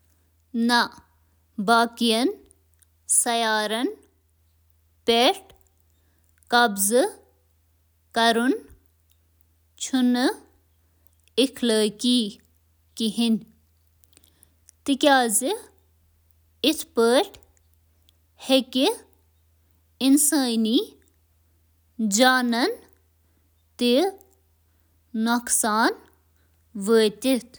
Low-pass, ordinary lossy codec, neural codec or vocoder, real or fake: none; none; none; real